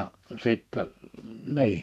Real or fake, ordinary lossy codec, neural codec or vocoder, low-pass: fake; none; codec, 32 kHz, 1.9 kbps, SNAC; 14.4 kHz